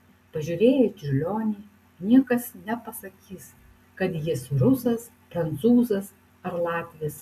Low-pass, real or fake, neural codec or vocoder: 14.4 kHz; real; none